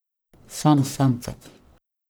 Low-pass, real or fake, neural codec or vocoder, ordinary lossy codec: none; fake; codec, 44.1 kHz, 1.7 kbps, Pupu-Codec; none